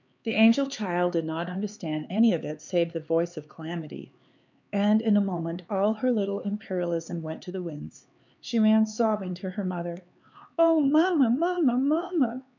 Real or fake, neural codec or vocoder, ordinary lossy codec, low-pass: fake; codec, 16 kHz, 4 kbps, X-Codec, HuBERT features, trained on LibriSpeech; MP3, 64 kbps; 7.2 kHz